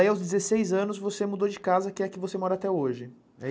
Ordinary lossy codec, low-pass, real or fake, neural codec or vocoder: none; none; real; none